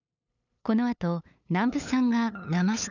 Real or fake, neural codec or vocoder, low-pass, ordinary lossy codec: fake; codec, 16 kHz, 8 kbps, FunCodec, trained on LibriTTS, 25 frames a second; 7.2 kHz; none